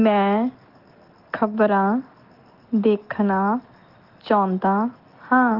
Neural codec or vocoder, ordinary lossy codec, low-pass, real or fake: none; Opus, 16 kbps; 5.4 kHz; real